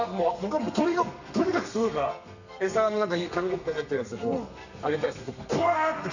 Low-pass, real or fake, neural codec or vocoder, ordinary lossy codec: 7.2 kHz; fake; codec, 32 kHz, 1.9 kbps, SNAC; none